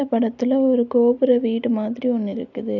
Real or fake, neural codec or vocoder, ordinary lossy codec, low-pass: real; none; none; 7.2 kHz